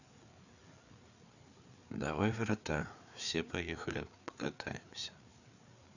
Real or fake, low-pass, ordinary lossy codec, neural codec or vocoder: fake; 7.2 kHz; none; codec, 16 kHz, 4 kbps, FreqCodec, larger model